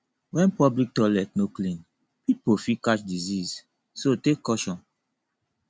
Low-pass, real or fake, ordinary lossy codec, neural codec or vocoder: none; real; none; none